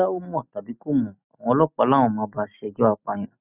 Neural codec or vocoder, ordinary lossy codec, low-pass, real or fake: none; none; 3.6 kHz; real